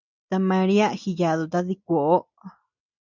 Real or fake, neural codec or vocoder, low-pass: real; none; 7.2 kHz